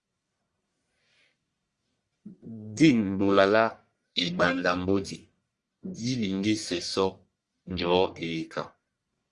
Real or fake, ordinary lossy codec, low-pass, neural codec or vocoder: fake; Opus, 64 kbps; 10.8 kHz; codec, 44.1 kHz, 1.7 kbps, Pupu-Codec